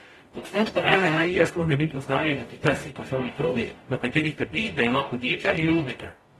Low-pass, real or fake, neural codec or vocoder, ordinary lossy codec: 19.8 kHz; fake; codec, 44.1 kHz, 0.9 kbps, DAC; AAC, 32 kbps